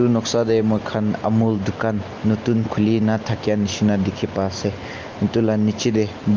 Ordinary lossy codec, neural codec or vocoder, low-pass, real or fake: Opus, 24 kbps; none; 7.2 kHz; real